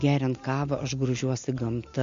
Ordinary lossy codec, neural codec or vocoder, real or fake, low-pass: MP3, 48 kbps; none; real; 7.2 kHz